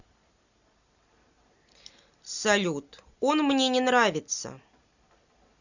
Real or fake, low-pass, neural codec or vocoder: real; 7.2 kHz; none